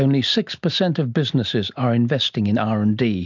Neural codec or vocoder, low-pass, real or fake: none; 7.2 kHz; real